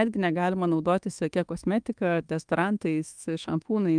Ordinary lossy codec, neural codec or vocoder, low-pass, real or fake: Opus, 32 kbps; codec, 24 kHz, 1.2 kbps, DualCodec; 9.9 kHz; fake